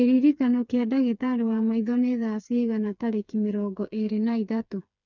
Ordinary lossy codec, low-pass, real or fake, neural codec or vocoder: none; 7.2 kHz; fake; codec, 16 kHz, 4 kbps, FreqCodec, smaller model